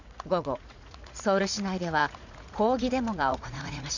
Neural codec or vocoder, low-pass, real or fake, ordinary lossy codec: vocoder, 44.1 kHz, 80 mel bands, Vocos; 7.2 kHz; fake; none